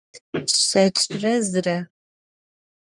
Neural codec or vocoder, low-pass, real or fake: codec, 44.1 kHz, 3.4 kbps, Pupu-Codec; 10.8 kHz; fake